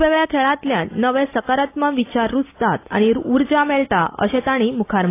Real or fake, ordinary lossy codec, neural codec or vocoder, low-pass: real; AAC, 24 kbps; none; 3.6 kHz